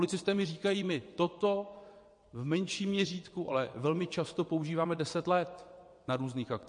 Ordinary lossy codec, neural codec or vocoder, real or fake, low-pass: MP3, 48 kbps; vocoder, 22.05 kHz, 80 mel bands, WaveNeXt; fake; 9.9 kHz